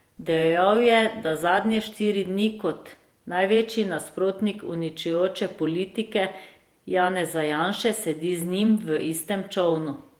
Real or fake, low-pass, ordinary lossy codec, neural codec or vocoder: fake; 19.8 kHz; Opus, 24 kbps; vocoder, 48 kHz, 128 mel bands, Vocos